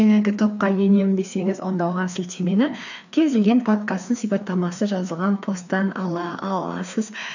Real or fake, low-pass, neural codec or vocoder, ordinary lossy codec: fake; 7.2 kHz; codec, 16 kHz, 2 kbps, FreqCodec, larger model; none